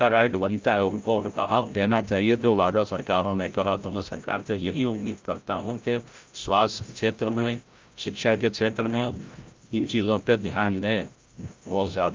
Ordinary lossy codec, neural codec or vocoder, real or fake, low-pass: Opus, 24 kbps; codec, 16 kHz, 0.5 kbps, FreqCodec, larger model; fake; 7.2 kHz